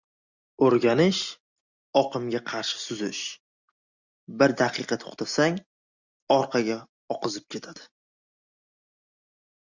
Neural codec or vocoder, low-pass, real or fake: none; 7.2 kHz; real